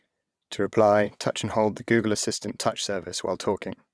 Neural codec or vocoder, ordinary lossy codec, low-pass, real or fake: vocoder, 22.05 kHz, 80 mel bands, WaveNeXt; none; none; fake